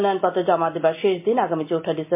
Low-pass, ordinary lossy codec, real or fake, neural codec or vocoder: 3.6 kHz; MP3, 24 kbps; real; none